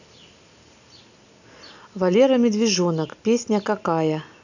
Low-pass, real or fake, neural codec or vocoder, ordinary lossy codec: 7.2 kHz; real; none; none